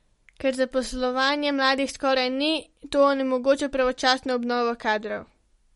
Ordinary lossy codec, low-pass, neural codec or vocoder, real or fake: MP3, 48 kbps; 19.8 kHz; none; real